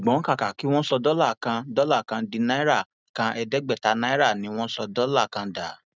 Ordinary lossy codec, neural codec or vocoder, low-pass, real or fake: none; none; none; real